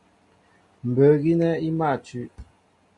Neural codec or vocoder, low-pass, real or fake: none; 10.8 kHz; real